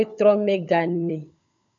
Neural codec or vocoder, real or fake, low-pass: codec, 16 kHz, 16 kbps, FunCodec, trained on LibriTTS, 50 frames a second; fake; 7.2 kHz